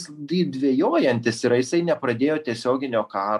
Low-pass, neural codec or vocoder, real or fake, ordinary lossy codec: 14.4 kHz; none; real; MP3, 96 kbps